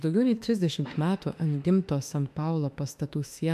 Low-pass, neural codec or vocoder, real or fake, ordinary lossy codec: 14.4 kHz; autoencoder, 48 kHz, 32 numbers a frame, DAC-VAE, trained on Japanese speech; fake; MP3, 96 kbps